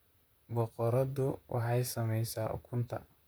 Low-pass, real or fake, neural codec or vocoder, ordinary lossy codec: none; fake; vocoder, 44.1 kHz, 128 mel bands, Pupu-Vocoder; none